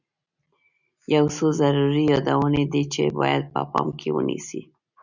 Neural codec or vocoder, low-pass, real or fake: none; 7.2 kHz; real